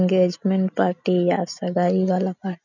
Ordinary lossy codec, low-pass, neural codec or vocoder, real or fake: none; 7.2 kHz; none; real